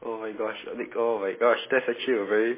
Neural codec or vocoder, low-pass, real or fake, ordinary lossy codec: none; 3.6 kHz; real; MP3, 16 kbps